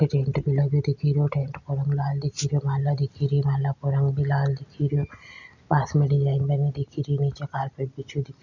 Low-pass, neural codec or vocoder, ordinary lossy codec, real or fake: 7.2 kHz; none; none; real